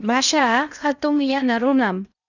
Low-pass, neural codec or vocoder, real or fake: 7.2 kHz; codec, 16 kHz in and 24 kHz out, 0.6 kbps, FocalCodec, streaming, 2048 codes; fake